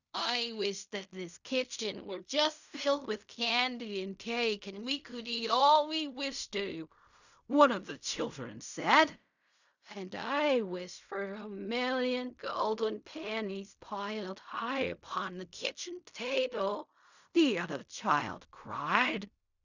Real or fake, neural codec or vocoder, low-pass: fake; codec, 16 kHz in and 24 kHz out, 0.4 kbps, LongCat-Audio-Codec, fine tuned four codebook decoder; 7.2 kHz